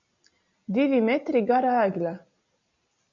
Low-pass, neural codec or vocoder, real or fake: 7.2 kHz; none; real